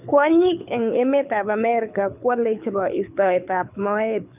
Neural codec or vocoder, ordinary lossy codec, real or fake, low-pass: codec, 24 kHz, 6 kbps, HILCodec; none; fake; 3.6 kHz